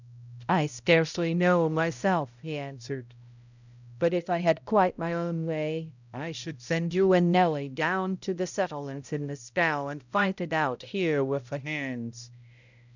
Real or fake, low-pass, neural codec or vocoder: fake; 7.2 kHz; codec, 16 kHz, 0.5 kbps, X-Codec, HuBERT features, trained on balanced general audio